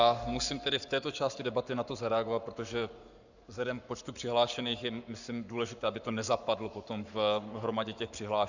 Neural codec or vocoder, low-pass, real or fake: codec, 44.1 kHz, 7.8 kbps, Pupu-Codec; 7.2 kHz; fake